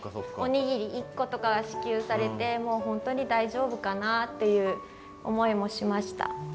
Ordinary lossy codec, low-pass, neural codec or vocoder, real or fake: none; none; none; real